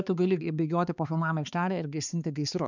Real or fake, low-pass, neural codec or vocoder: fake; 7.2 kHz; codec, 16 kHz, 4 kbps, X-Codec, HuBERT features, trained on balanced general audio